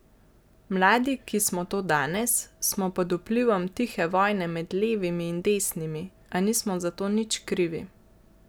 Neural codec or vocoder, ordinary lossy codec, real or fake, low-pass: none; none; real; none